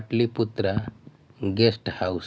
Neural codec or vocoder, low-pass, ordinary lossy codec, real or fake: none; none; none; real